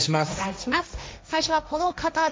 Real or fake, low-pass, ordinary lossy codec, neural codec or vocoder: fake; none; none; codec, 16 kHz, 1.1 kbps, Voila-Tokenizer